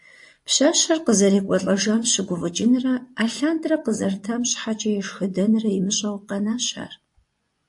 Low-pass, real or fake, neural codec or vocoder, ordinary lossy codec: 10.8 kHz; fake; vocoder, 24 kHz, 100 mel bands, Vocos; AAC, 64 kbps